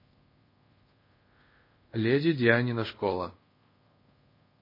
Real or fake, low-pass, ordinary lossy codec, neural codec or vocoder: fake; 5.4 kHz; MP3, 24 kbps; codec, 24 kHz, 0.5 kbps, DualCodec